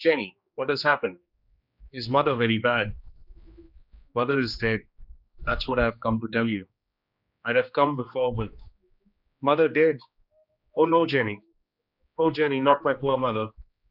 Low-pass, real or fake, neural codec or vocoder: 5.4 kHz; fake; codec, 16 kHz, 2 kbps, X-Codec, HuBERT features, trained on general audio